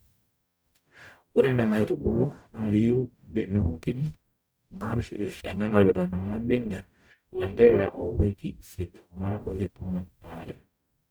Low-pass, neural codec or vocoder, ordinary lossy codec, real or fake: none; codec, 44.1 kHz, 0.9 kbps, DAC; none; fake